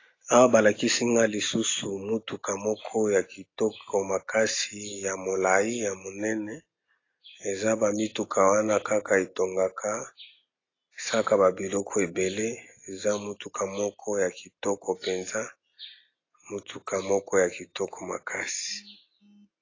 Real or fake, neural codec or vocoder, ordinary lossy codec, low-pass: real; none; AAC, 32 kbps; 7.2 kHz